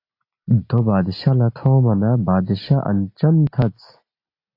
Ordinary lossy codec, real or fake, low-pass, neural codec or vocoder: AAC, 32 kbps; real; 5.4 kHz; none